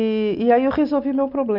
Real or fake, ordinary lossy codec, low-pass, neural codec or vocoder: real; Opus, 64 kbps; 5.4 kHz; none